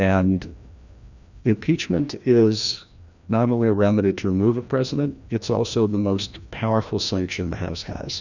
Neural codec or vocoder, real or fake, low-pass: codec, 16 kHz, 1 kbps, FreqCodec, larger model; fake; 7.2 kHz